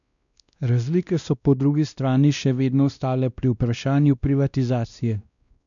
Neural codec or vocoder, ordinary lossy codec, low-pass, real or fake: codec, 16 kHz, 1 kbps, X-Codec, WavLM features, trained on Multilingual LibriSpeech; none; 7.2 kHz; fake